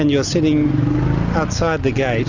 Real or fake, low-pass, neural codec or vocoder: real; 7.2 kHz; none